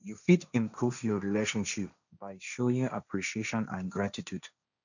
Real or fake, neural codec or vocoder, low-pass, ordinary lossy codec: fake; codec, 16 kHz, 1.1 kbps, Voila-Tokenizer; 7.2 kHz; none